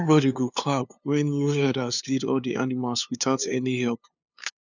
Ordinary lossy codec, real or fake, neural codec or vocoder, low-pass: none; fake; codec, 16 kHz, 2 kbps, FunCodec, trained on LibriTTS, 25 frames a second; 7.2 kHz